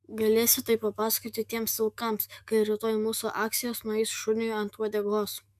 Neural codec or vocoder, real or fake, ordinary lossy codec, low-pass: autoencoder, 48 kHz, 128 numbers a frame, DAC-VAE, trained on Japanese speech; fake; MP3, 96 kbps; 14.4 kHz